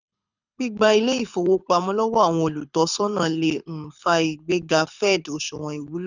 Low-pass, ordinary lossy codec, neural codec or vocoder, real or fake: 7.2 kHz; none; codec, 24 kHz, 6 kbps, HILCodec; fake